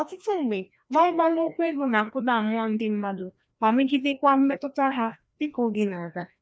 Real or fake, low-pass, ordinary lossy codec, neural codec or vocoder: fake; none; none; codec, 16 kHz, 1 kbps, FreqCodec, larger model